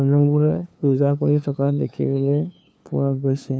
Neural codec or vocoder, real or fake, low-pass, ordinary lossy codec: codec, 16 kHz, 2 kbps, FunCodec, trained on LibriTTS, 25 frames a second; fake; none; none